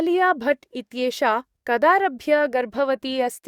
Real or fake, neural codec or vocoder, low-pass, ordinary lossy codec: fake; codec, 44.1 kHz, 7.8 kbps, DAC; 19.8 kHz; Opus, 64 kbps